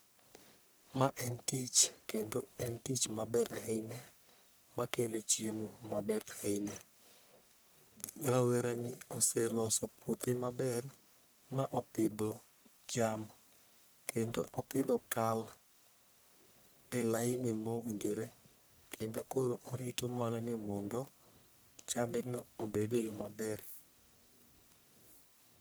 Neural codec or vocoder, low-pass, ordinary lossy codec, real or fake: codec, 44.1 kHz, 1.7 kbps, Pupu-Codec; none; none; fake